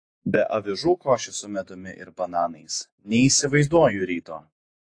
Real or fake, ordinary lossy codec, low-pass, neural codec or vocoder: fake; AAC, 48 kbps; 9.9 kHz; vocoder, 48 kHz, 128 mel bands, Vocos